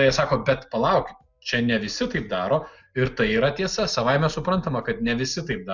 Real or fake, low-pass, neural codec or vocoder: real; 7.2 kHz; none